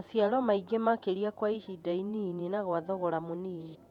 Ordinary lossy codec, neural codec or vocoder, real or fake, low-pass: none; vocoder, 48 kHz, 128 mel bands, Vocos; fake; 19.8 kHz